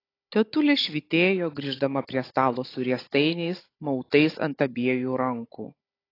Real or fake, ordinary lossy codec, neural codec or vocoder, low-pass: fake; AAC, 24 kbps; codec, 16 kHz, 16 kbps, FunCodec, trained on Chinese and English, 50 frames a second; 5.4 kHz